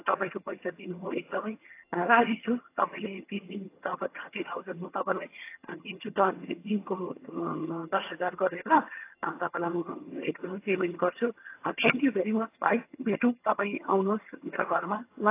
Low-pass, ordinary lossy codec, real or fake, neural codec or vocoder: 3.6 kHz; AAC, 24 kbps; fake; vocoder, 22.05 kHz, 80 mel bands, HiFi-GAN